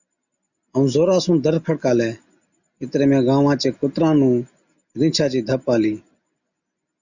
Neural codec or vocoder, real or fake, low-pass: none; real; 7.2 kHz